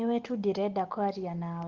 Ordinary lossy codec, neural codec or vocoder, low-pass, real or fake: Opus, 16 kbps; none; 7.2 kHz; real